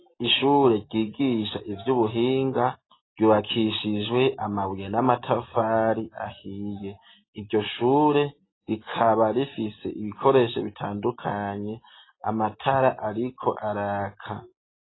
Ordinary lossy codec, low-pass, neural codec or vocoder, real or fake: AAC, 16 kbps; 7.2 kHz; none; real